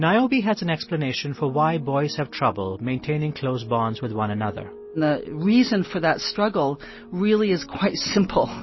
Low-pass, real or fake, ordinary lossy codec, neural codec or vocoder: 7.2 kHz; real; MP3, 24 kbps; none